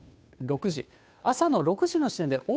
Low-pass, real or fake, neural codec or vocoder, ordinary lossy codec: none; fake; codec, 16 kHz, 2 kbps, FunCodec, trained on Chinese and English, 25 frames a second; none